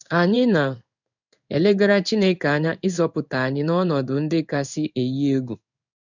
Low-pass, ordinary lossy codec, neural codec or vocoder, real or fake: 7.2 kHz; none; codec, 16 kHz in and 24 kHz out, 1 kbps, XY-Tokenizer; fake